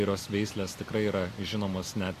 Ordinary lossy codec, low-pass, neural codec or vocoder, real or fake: AAC, 48 kbps; 14.4 kHz; none; real